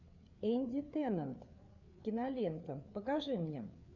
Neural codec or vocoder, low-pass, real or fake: codec, 16 kHz, 4 kbps, FreqCodec, larger model; 7.2 kHz; fake